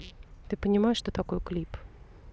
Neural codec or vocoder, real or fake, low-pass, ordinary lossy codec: none; real; none; none